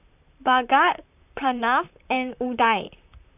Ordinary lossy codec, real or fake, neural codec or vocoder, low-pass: none; fake; vocoder, 44.1 kHz, 128 mel bands, Pupu-Vocoder; 3.6 kHz